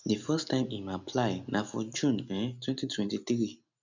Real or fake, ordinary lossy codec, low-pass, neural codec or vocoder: real; none; 7.2 kHz; none